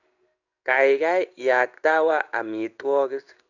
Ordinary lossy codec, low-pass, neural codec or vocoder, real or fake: none; 7.2 kHz; codec, 16 kHz in and 24 kHz out, 1 kbps, XY-Tokenizer; fake